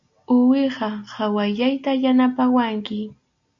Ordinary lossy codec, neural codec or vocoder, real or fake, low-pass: AAC, 64 kbps; none; real; 7.2 kHz